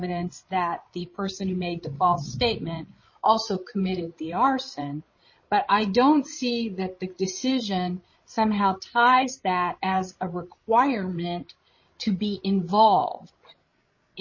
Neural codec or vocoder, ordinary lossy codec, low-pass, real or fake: codec, 16 kHz, 16 kbps, FreqCodec, larger model; MP3, 32 kbps; 7.2 kHz; fake